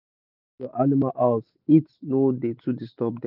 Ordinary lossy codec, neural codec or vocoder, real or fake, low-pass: none; none; real; 5.4 kHz